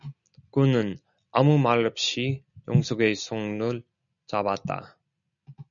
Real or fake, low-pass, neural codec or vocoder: real; 7.2 kHz; none